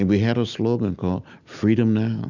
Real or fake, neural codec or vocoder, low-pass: real; none; 7.2 kHz